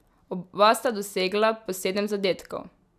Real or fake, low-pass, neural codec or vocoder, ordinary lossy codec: real; 14.4 kHz; none; none